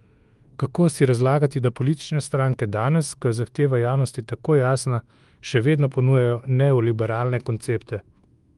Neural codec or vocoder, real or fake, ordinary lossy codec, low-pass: codec, 24 kHz, 1.2 kbps, DualCodec; fake; Opus, 24 kbps; 10.8 kHz